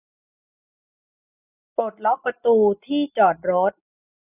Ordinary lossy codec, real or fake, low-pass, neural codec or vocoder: none; real; 3.6 kHz; none